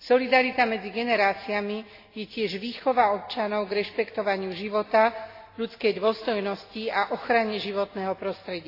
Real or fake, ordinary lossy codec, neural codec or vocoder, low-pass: real; AAC, 32 kbps; none; 5.4 kHz